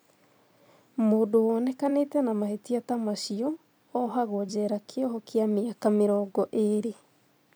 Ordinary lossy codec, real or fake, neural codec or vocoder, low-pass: none; fake; vocoder, 44.1 kHz, 128 mel bands every 256 samples, BigVGAN v2; none